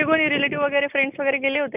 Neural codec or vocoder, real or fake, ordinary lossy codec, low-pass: none; real; none; 3.6 kHz